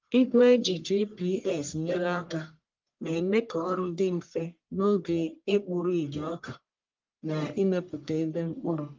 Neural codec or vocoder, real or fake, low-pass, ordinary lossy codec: codec, 44.1 kHz, 1.7 kbps, Pupu-Codec; fake; 7.2 kHz; Opus, 32 kbps